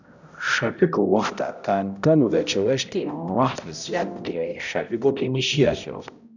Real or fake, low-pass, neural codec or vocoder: fake; 7.2 kHz; codec, 16 kHz, 0.5 kbps, X-Codec, HuBERT features, trained on balanced general audio